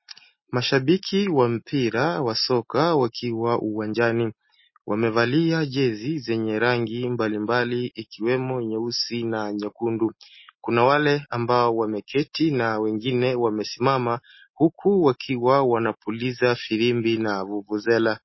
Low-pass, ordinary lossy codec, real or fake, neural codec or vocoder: 7.2 kHz; MP3, 24 kbps; real; none